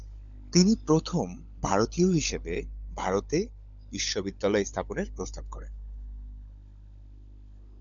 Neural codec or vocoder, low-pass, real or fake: codec, 16 kHz, 16 kbps, FunCodec, trained on LibriTTS, 50 frames a second; 7.2 kHz; fake